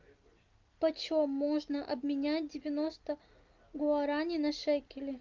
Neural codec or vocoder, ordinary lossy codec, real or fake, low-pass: none; Opus, 24 kbps; real; 7.2 kHz